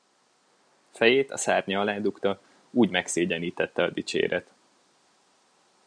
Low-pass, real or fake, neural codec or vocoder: 9.9 kHz; real; none